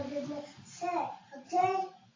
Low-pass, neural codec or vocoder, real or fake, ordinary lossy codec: 7.2 kHz; none; real; AAC, 32 kbps